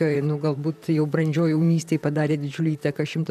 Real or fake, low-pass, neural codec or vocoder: fake; 14.4 kHz; vocoder, 44.1 kHz, 128 mel bands, Pupu-Vocoder